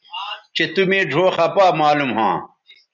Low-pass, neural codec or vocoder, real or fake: 7.2 kHz; none; real